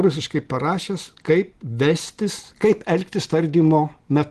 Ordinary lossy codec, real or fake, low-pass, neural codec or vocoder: Opus, 16 kbps; real; 10.8 kHz; none